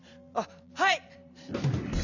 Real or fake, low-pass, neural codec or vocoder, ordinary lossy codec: fake; 7.2 kHz; vocoder, 44.1 kHz, 128 mel bands every 256 samples, BigVGAN v2; none